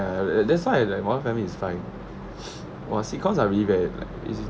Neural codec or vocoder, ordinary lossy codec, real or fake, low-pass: none; none; real; none